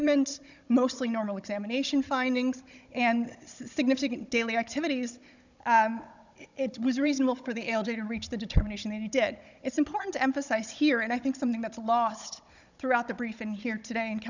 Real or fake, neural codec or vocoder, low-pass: fake; codec, 16 kHz, 16 kbps, FunCodec, trained on Chinese and English, 50 frames a second; 7.2 kHz